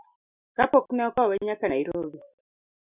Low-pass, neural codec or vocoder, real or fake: 3.6 kHz; none; real